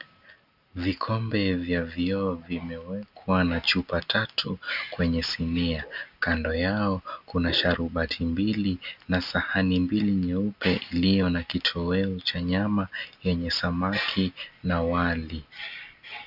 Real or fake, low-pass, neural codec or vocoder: real; 5.4 kHz; none